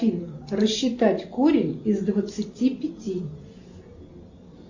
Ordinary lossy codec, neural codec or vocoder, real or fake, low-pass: Opus, 64 kbps; none; real; 7.2 kHz